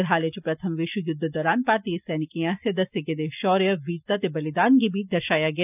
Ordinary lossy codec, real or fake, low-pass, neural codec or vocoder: none; real; 3.6 kHz; none